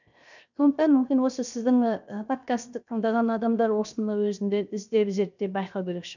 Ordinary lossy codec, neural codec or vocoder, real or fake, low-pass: none; codec, 16 kHz, 0.7 kbps, FocalCodec; fake; 7.2 kHz